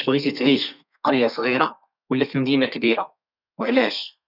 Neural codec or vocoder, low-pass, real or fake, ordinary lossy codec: codec, 32 kHz, 1.9 kbps, SNAC; 5.4 kHz; fake; none